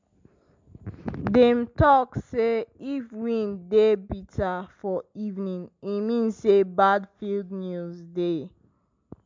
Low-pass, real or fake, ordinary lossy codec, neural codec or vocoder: 7.2 kHz; real; MP3, 64 kbps; none